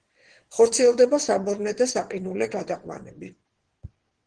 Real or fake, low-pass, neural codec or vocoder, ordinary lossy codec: fake; 9.9 kHz; vocoder, 22.05 kHz, 80 mel bands, WaveNeXt; Opus, 16 kbps